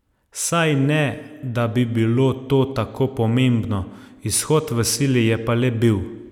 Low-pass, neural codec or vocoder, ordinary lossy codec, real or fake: 19.8 kHz; none; none; real